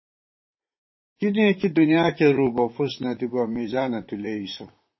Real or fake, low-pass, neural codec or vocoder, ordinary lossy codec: fake; 7.2 kHz; vocoder, 44.1 kHz, 128 mel bands, Pupu-Vocoder; MP3, 24 kbps